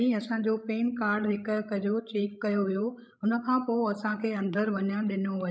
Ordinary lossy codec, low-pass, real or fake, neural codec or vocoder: none; none; fake; codec, 16 kHz, 16 kbps, FreqCodec, larger model